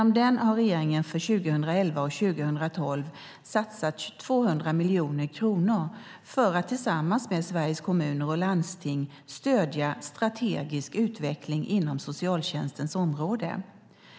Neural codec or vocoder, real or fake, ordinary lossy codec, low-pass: none; real; none; none